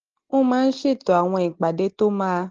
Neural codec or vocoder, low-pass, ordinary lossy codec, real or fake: none; 7.2 kHz; Opus, 16 kbps; real